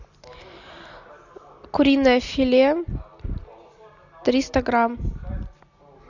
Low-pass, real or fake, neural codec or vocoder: 7.2 kHz; real; none